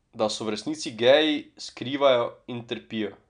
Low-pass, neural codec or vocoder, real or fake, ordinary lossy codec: 9.9 kHz; none; real; none